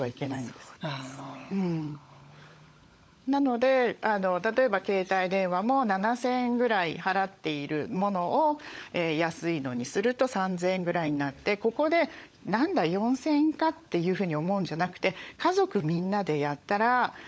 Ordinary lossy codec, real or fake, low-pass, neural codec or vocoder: none; fake; none; codec, 16 kHz, 16 kbps, FunCodec, trained on LibriTTS, 50 frames a second